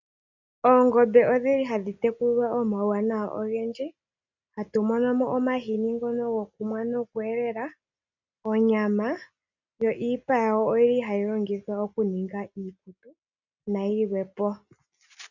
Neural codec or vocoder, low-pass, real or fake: none; 7.2 kHz; real